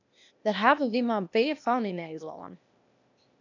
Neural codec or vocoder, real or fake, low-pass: codec, 16 kHz, 0.8 kbps, ZipCodec; fake; 7.2 kHz